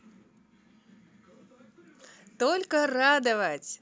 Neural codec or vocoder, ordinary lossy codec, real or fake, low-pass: none; none; real; none